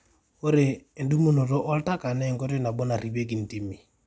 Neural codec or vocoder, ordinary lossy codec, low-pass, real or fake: none; none; none; real